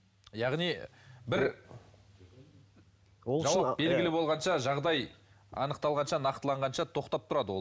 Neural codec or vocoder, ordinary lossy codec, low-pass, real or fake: none; none; none; real